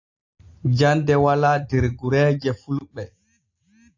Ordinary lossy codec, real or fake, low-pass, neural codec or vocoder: AAC, 48 kbps; real; 7.2 kHz; none